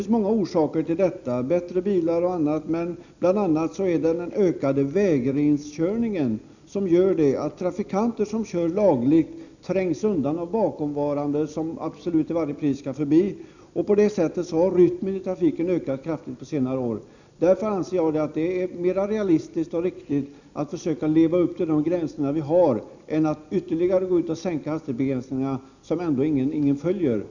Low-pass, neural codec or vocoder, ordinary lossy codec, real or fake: 7.2 kHz; none; none; real